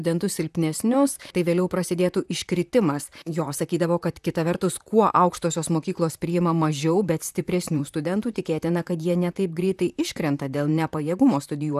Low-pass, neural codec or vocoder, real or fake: 14.4 kHz; vocoder, 44.1 kHz, 128 mel bands, Pupu-Vocoder; fake